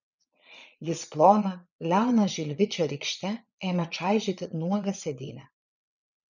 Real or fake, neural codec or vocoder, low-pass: fake; vocoder, 22.05 kHz, 80 mel bands, Vocos; 7.2 kHz